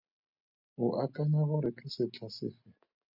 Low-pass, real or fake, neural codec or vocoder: 5.4 kHz; real; none